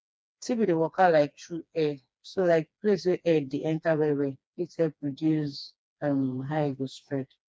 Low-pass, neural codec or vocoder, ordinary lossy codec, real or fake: none; codec, 16 kHz, 2 kbps, FreqCodec, smaller model; none; fake